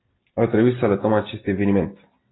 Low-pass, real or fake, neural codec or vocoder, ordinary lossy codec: 7.2 kHz; real; none; AAC, 16 kbps